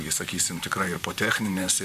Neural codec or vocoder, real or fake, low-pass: vocoder, 44.1 kHz, 128 mel bands, Pupu-Vocoder; fake; 14.4 kHz